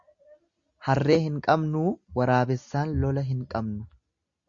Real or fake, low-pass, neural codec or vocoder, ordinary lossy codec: real; 7.2 kHz; none; Opus, 64 kbps